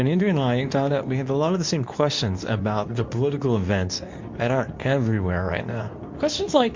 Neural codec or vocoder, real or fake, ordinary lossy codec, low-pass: codec, 24 kHz, 0.9 kbps, WavTokenizer, medium speech release version 2; fake; MP3, 48 kbps; 7.2 kHz